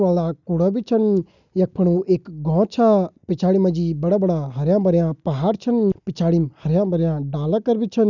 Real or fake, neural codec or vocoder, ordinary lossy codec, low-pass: real; none; none; 7.2 kHz